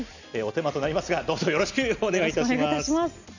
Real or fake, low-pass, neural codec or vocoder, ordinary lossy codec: real; 7.2 kHz; none; none